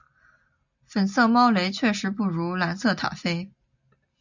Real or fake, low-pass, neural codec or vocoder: real; 7.2 kHz; none